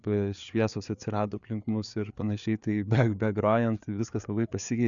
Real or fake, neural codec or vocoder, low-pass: fake; codec, 16 kHz, 4 kbps, FreqCodec, larger model; 7.2 kHz